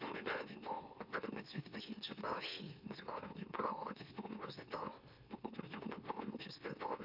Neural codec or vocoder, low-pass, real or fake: autoencoder, 44.1 kHz, a latent of 192 numbers a frame, MeloTTS; 5.4 kHz; fake